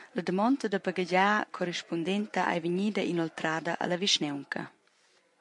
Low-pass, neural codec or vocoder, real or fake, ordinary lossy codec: 10.8 kHz; vocoder, 24 kHz, 100 mel bands, Vocos; fake; MP3, 64 kbps